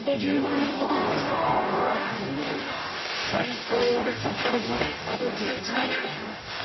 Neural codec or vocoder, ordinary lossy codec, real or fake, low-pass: codec, 44.1 kHz, 0.9 kbps, DAC; MP3, 24 kbps; fake; 7.2 kHz